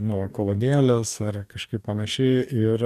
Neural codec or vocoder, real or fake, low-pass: codec, 44.1 kHz, 2.6 kbps, DAC; fake; 14.4 kHz